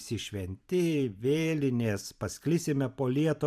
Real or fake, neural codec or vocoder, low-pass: fake; vocoder, 44.1 kHz, 128 mel bands every 512 samples, BigVGAN v2; 14.4 kHz